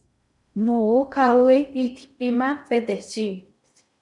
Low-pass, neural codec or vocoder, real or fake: 10.8 kHz; codec, 16 kHz in and 24 kHz out, 0.6 kbps, FocalCodec, streaming, 4096 codes; fake